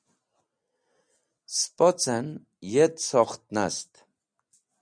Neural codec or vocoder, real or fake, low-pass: none; real; 9.9 kHz